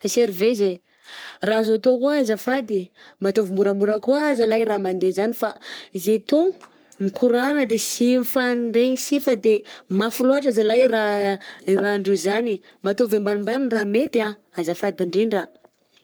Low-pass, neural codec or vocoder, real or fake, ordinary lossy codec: none; codec, 44.1 kHz, 3.4 kbps, Pupu-Codec; fake; none